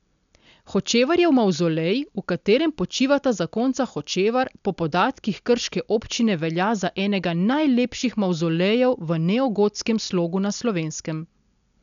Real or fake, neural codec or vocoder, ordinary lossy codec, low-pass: real; none; none; 7.2 kHz